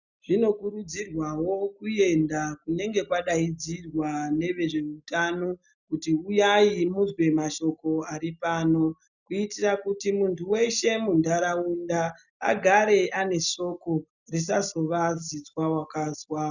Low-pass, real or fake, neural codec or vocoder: 7.2 kHz; real; none